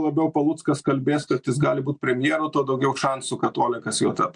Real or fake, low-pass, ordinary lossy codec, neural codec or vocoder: real; 9.9 kHz; MP3, 64 kbps; none